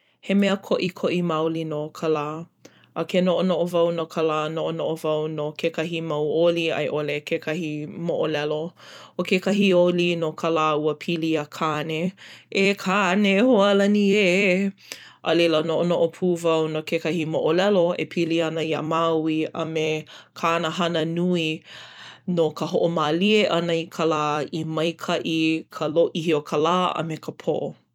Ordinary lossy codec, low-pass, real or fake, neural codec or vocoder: none; 19.8 kHz; fake; vocoder, 44.1 kHz, 128 mel bands every 256 samples, BigVGAN v2